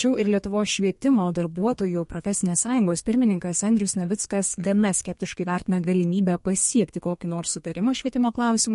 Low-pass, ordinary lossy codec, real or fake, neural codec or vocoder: 14.4 kHz; MP3, 48 kbps; fake; codec, 32 kHz, 1.9 kbps, SNAC